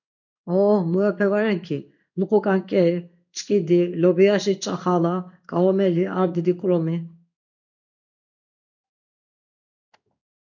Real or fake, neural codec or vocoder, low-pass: fake; codec, 16 kHz in and 24 kHz out, 1 kbps, XY-Tokenizer; 7.2 kHz